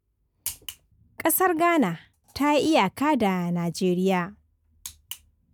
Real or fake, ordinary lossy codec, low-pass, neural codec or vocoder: real; none; none; none